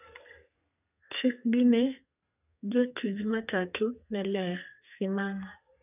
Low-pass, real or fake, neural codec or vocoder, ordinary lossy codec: 3.6 kHz; fake; codec, 32 kHz, 1.9 kbps, SNAC; none